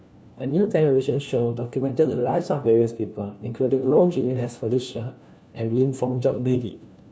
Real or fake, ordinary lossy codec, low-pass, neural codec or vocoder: fake; none; none; codec, 16 kHz, 1 kbps, FunCodec, trained on LibriTTS, 50 frames a second